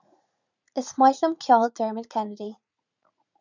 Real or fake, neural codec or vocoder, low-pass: fake; vocoder, 44.1 kHz, 80 mel bands, Vocos; 7.2 kHz